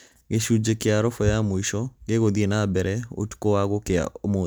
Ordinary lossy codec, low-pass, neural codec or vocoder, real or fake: none; none; none; real